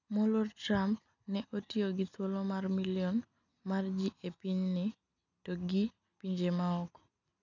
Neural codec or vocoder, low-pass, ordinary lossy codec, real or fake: none; 7.2 kHz; none; real